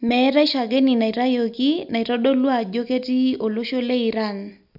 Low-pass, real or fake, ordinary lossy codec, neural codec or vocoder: 5.4 kHz; real; none; none